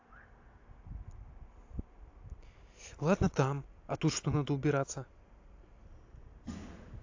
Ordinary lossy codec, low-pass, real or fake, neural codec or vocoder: AAC, 48 kbps; 7.2 kHz; real; none